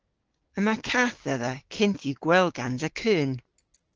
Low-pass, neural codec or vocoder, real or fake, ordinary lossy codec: 7.2 kHz; codec, 16 kHz, 6 kbps, DAC; fake; Opus, 16 kbps